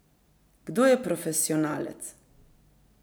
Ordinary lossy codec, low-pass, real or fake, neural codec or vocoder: none; none; real; none